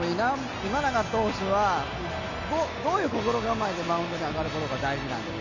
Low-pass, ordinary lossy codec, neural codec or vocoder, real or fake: 7.2 kHz; none; none; real